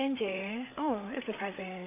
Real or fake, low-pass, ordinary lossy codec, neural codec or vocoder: fake; 3.6 kHz; none; codec, 16 kHz, 8 kbps, FreqCodec, larger model